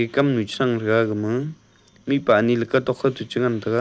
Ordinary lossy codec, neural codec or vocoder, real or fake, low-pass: none; none; real; none